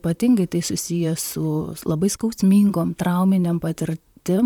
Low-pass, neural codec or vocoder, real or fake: 19.8 kHz; vocoder, 44.1 kHz, 128 mel bands, Pupu-Vocoder; fake